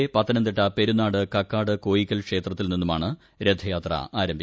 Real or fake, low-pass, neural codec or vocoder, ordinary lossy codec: real; none; none; none